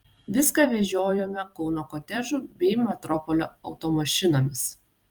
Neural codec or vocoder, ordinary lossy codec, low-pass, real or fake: vocoder, 44.1 kHz, 128 mel bands every 256 samples, BigVGAN v2; Opus, 32 kbps; 19.8 kHz; fake